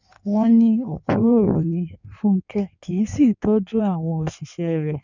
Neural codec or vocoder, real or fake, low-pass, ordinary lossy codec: codec, 16 kHz in and 24 kHz out, 1.1 kbps, FireRedTTS-2 codec; fake; 7.2 kHz; none